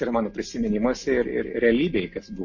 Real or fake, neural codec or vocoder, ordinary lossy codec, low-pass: real; none; MP3, 32 kbps; 7.2 kHz